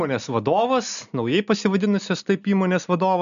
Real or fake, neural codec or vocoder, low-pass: real; none; 7.2 kHz